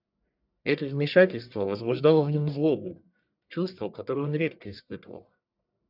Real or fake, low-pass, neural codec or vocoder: fake; 5.4 kHz; codec, 44.1 kHz, 1.7 kbps, Pupu-Codec